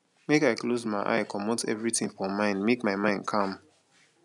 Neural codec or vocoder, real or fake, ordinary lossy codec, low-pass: none; real; none; 10.8 kHz